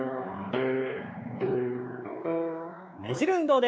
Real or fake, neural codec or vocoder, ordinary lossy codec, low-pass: fake; codec, 16 kHz, 4 kbps, X-Codec, WavLM features, trained on Multilingual LibriSpeech; none; none